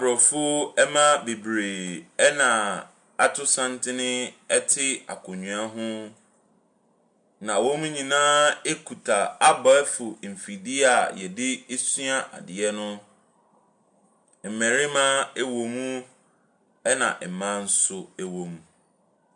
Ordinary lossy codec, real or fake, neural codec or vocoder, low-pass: MP3, 64 kbps; real; none; 9.9 kHz